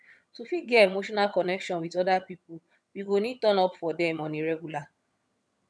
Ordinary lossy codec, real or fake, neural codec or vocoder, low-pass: none; fake; vocoder, 22.05 kHz, 80 mel bands, HiFi-GAN; none